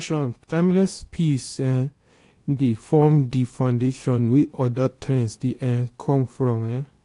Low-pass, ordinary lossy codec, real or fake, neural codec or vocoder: 10.8 kHz; AAC, 48 kbps; fake; codec, 16 kHz in and 24 kHz out, 0.8 kbps, FocalCodec, streaming, 65536 codes